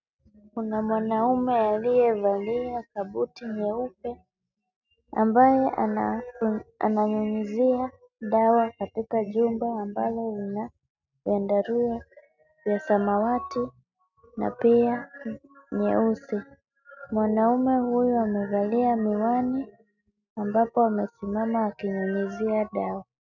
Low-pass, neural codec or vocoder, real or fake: 7.2 kHz; none; real